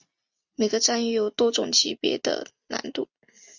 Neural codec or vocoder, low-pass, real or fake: none; 7.2 kHz; real